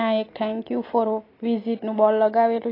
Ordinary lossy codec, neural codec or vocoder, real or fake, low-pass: AAC, 24 kbps; none; real; 5.4 kHz